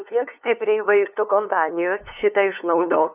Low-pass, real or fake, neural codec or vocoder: 3.6 kHz; fake; codec, 16 kHz, 2 kbps, FunCodec, trained on LibriTTS, 25 frames a second